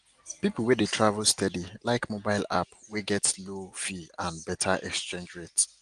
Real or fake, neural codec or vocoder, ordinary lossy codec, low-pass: real; none; Opus, 24 kbps; 9.9 kHz